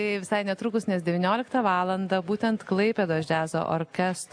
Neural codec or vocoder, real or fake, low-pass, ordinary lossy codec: none; real; 9.9 kHz; AAC, 64 kbps